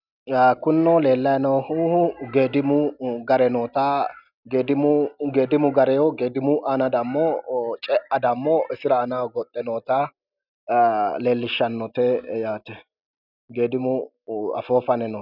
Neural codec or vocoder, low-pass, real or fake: none; 5.4 kHz; real